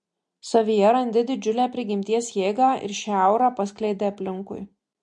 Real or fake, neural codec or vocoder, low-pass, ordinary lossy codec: real; none; 10.8 kHz; MP3, 48 kbps